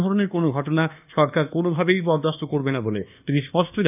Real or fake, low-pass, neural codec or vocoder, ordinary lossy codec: fake; 3.6 kHz; codec, 24 kHz, 1.2 kbps, DualCodec; none